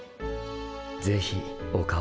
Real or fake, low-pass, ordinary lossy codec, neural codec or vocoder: real; none; none; none